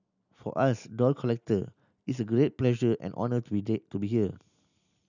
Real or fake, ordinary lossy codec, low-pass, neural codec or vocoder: real; none; 7.2 kHz; none